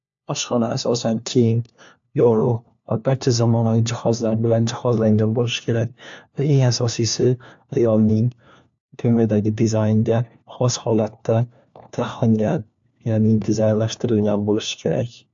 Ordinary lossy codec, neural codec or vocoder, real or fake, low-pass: AAC, 64 kbps; codec, 16 kHz, 1 kbps, FunCodec, trained on LibriTTS, 50 frames a second; fake; 7.2 kHz